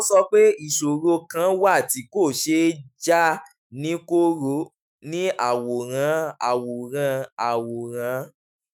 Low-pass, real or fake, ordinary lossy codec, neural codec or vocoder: none; fake; none; autoencoder, 48 kHz, 128 numbers a frame, DAC-VAE, trained on Japanese speech